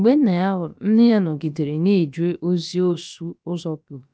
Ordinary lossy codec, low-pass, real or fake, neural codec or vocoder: none; none; fake; codec, 16 kHz, about 1 kbps, DyCAST, with the encoder's durations